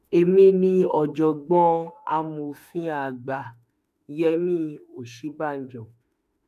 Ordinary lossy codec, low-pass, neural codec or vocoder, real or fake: MP3, 96 kbps; 14.4 kHz; autoencoder, 48 kHz, 32 numbers a frame, DAC-VAE, trained on Japanese speech; fake